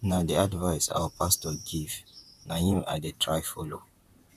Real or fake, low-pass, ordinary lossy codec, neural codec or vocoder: fake; 14.4 kHz; none; vocoder, 44.1 kHz, 128 mel bands, Pupu-Vocoder